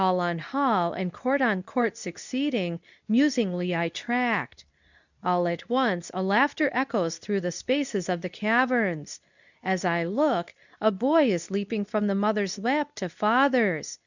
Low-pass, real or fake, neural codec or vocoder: 7.2 kHz; fake; codec, 24 kHz, 0.9 kbps, WavTokenizer, medium speech release version 2